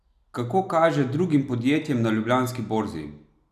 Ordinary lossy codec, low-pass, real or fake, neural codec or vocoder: none; 14.4 kHz; real; none